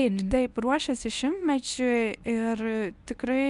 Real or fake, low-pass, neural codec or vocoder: fake; 10.8 kHz; codec, 24 kHz, 0.9 kbps, WavTokenizer, medium speech release version 1